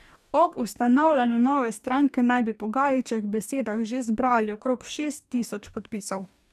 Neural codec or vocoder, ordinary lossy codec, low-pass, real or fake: codec, 44.1 kHz, 2.6 kbps, DAC; none; 14.4 kHz; fake